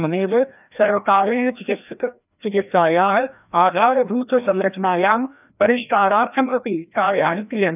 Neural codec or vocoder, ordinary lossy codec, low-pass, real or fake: codec, 16 kHz, 1 kbps, FreqCodec, larger model; none; 3.6 kHz; fake